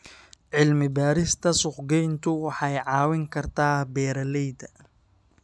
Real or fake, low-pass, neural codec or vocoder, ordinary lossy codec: real; none; none; none